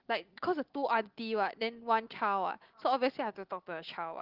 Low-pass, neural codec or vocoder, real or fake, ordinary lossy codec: 5.4 kHz; none; real; Opus, 16 kbps